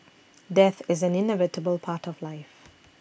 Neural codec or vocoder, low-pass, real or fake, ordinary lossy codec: none; none; real; none